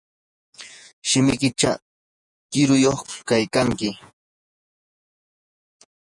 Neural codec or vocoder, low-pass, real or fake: vocoder, 48 kHz, 128 mel bands, Vocos; 10.8 kHz; fake